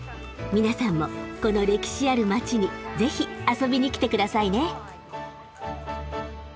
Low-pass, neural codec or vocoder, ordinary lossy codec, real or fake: none; none; none; real